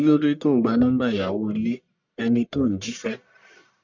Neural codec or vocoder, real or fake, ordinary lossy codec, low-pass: codec, 44.1 kHz, 1.7 kbps, Pupu-Codec; fake; none; 7.2 kHz